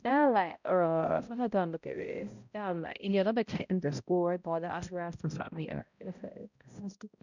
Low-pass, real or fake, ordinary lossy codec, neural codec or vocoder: 7.2 kHz; fake; none; codec, 16 kHz, 0.5 kbps, X-Codec, HuBERT features, trained on balanced general audio